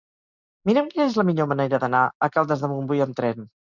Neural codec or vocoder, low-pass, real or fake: none; 7.2 kHz; real